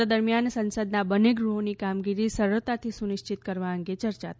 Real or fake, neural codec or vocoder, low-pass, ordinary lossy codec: real; none; none; none